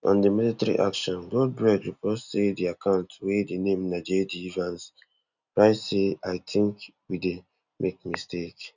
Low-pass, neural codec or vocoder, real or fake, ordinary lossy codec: 7.2 kHz; none; real; none